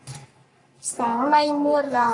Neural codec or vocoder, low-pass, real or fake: codec, 44.1 kHz, 3.4 kbps, Pupu-Codec; 10.8 kHz; fake